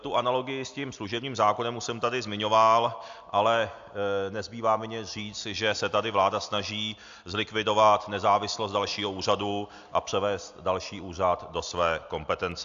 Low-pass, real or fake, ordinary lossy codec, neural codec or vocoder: 7.2 kHz; real; AAC, 64 kbps; none